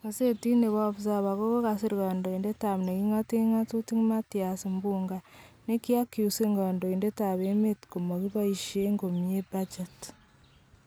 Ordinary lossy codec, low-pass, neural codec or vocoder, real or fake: none; none; none; real